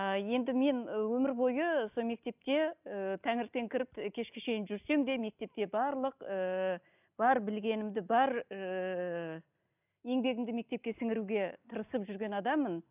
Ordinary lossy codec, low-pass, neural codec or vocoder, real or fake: none; 3.6 kHz; none; real